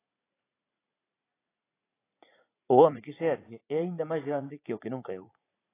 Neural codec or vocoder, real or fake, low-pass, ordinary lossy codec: vocoder, 44.1 kHz, 80 mel bands, Vocos; fake; 3.6 kHz; AAC, 16 kbps